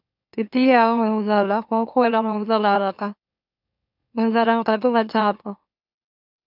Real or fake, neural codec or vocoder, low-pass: fake; autoencoder, 44.1 kHz, a latent of 192 numbers a frame, MeloTTS; 5.4 kHz